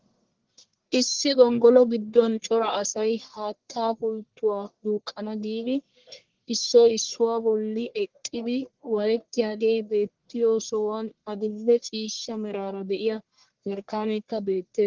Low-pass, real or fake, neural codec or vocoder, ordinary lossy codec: 7.2 kHz; fake; codec, 44.1 kHz, 1.7 kbps, Pupu-Codec; Opus, 16 kbps